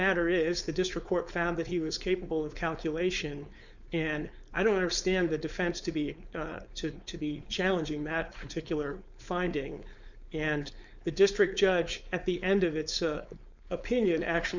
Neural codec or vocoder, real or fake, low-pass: codec, 16 kHz, 4.8 kbps, FACodec; fake; 7.2 kHz